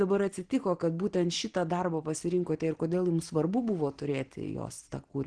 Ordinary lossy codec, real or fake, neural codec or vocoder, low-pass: Opus, 16 kbps; real; none; 9.9 kHz